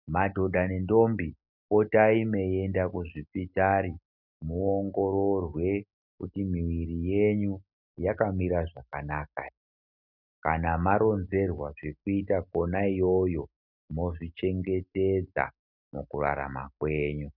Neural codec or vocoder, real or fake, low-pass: none; real; 5.4 kHz